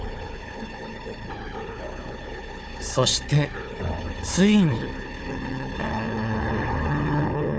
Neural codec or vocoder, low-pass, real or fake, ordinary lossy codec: codec, 16 kHz, 4 kbps, FunCodec, trained on Chinese and English, 50 frames a second; none; fake; none